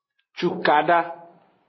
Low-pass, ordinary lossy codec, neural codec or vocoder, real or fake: 7.2 kHz; MP3, 24 kbps; none; real